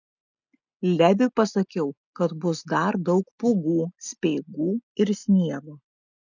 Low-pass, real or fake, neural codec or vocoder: 7.2 kHz; real; none